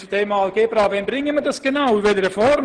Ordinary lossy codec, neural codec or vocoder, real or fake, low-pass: Opus, 16 kbps; none; real; 9.9 kHz